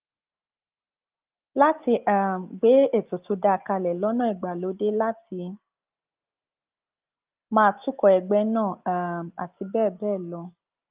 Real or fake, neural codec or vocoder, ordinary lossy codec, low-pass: real; none; Opus, 32 kbps; 3.6 kHz